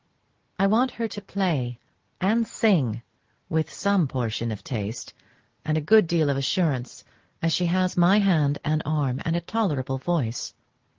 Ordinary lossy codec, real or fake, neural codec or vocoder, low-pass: Opus, 16 kbps; real; none; 7.2 kHz